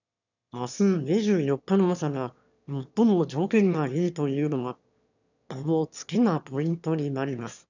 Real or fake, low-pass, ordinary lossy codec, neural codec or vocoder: fake; 7.2 kHz; none; autoencoder, 22.05 kHz, a latent of 192 numbers a frame, VITS, trained on one speaker